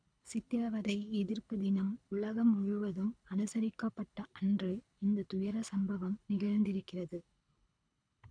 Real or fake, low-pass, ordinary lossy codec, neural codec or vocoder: fake; 9.9 kHz; none; codec, 24 kHz, 6 kbps, HILCodec